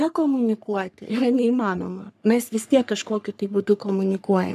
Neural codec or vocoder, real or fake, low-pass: codec, 44.1 kHz, 3.4 kbps, Pupu-Codec; fake; 14.4 kHz